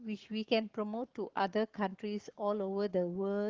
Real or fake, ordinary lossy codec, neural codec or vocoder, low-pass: fake; Opus, 16 kbps; codec, 44.1 kHz, 7.8 kbps, Pupu-Codec; 7.2 kHz